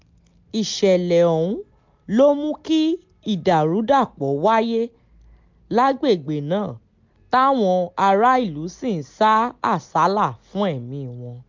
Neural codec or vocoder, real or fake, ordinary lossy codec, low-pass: none; real; MP3, 64 kbps; 7.2 kHz